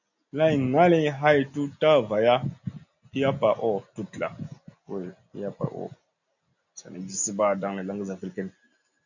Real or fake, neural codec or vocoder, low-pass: real; none; 7.2 kHz